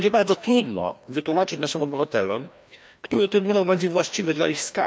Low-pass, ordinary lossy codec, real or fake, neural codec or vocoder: none; none; fake; codec, 16 kHz, 1 kbps, FreqCodec, larger model